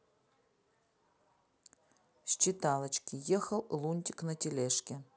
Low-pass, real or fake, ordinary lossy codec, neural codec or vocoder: none; real; none; none